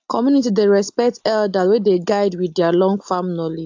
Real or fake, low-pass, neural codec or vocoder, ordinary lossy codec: real; 7.2 kHz; none; AAC, 48 kbps